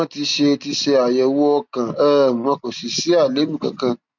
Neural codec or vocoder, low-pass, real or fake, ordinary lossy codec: none; 7.2 kHz; real; none